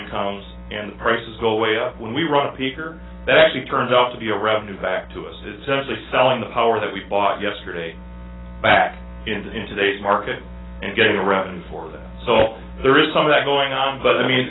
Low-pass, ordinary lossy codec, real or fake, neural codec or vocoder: 7.2 kHz; AAC, 16 kbps; real; none